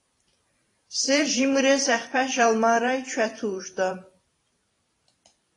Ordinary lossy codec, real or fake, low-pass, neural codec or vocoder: AAC, 32 kbps; fake; 10.8 kHz; vocoder, 44.1 kHz, 128 mel bands every 512 samples, BigVGAN v2